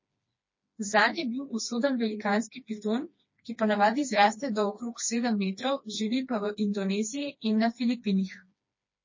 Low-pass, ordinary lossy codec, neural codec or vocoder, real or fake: 7.2 kHz; MP3, 32 kbps; codec, 16 kHz, 2 kbps, FreqCodec, smaller model; fake